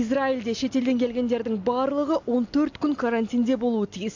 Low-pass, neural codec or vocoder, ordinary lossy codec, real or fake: 7.2 kHz; none; AAC, 48 kbps; real